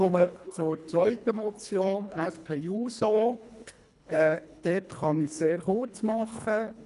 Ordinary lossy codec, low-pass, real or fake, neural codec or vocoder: none; 10.8 kHz; fake; codec, 24 kHz, 1.5 kbps, HILCodec